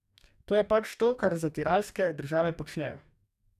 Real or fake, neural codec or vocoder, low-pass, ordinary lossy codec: fake; codec, 44.1 kHz, 2.6 kbps, DAC; 14.4 kHz; none